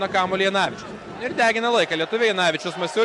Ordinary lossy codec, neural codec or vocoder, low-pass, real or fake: AAC, 64 kbps; none; 10.8 kHz; real